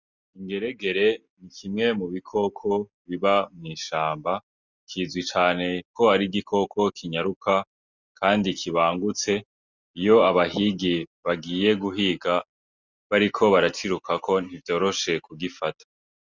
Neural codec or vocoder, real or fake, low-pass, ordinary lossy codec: none; real; 7.2 kHz; Opus, 64 kbps